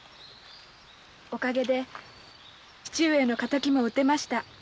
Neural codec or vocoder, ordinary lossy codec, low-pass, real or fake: none; none; none; real